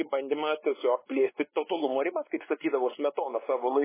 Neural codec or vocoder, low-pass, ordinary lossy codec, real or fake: codec, 16 kHz, 2 kbps, X-Codec, WavLM features, trained on Multilingual LibriSpeech; 3.6 kHz; MP3, 16 kbps; fake